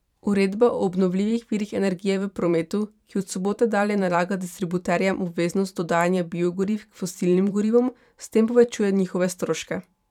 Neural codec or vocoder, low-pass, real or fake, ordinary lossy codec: vocoder, 44.1 kHz, 128 mel bands every 512 samples, BigVGAN v2; 19.8 kHz; fake; none